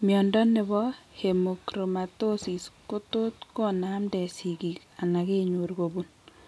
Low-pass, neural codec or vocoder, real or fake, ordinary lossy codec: none; none; real; none